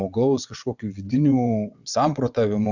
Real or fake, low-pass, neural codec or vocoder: fake; 7.2 kHz; vocoder, 22.05 kHz, 80 mel bands, WaveNeXt